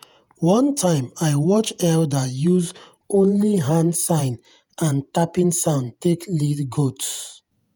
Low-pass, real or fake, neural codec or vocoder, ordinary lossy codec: none; fake; vocoder, 48 kHz, 128 mel bands, Vocos; none